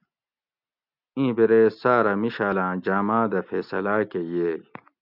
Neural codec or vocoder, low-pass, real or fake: none; 5.4 kHz; real